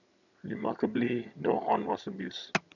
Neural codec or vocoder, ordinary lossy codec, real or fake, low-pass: vocoder, 22.05 kHz, 80 mel bands, HiFi-GAN; none; fake; 7.2 kHz